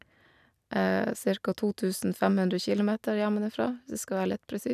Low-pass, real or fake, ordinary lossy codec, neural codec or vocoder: 14.4 kHz; real; none; none